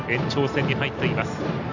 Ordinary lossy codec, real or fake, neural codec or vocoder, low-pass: none; real; none; 7.2 kHz